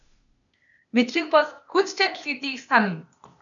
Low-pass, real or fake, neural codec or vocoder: 7.2 kHz; fake; codec, 16 kHz, 0.8 kbps, ZipCodec